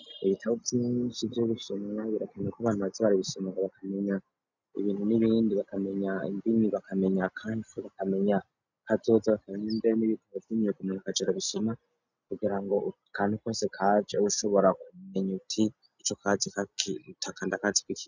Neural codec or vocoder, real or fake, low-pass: none; real; 7.2 kHz